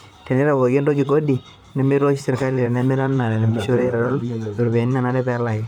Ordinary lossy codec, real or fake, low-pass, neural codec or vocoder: none; fake; 19.8 kHz; vocoder, 44.1 kHz, 128 mel bands, Pupu-Vocoder